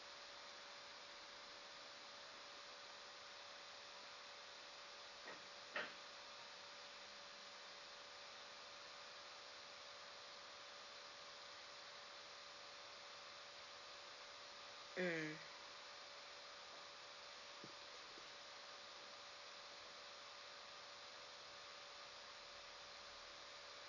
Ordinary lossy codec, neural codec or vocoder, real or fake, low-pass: none; none; real; 7.2 kHz